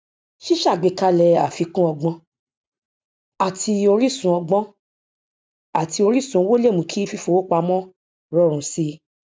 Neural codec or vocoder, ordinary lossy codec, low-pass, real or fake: none; none; none; real